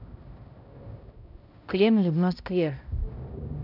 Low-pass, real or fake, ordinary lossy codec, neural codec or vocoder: 5.4 kHz; fake; none; codec, 16 kHz, 0.5 kbps, X-Codec, HuBERT features, trained on balanced general audio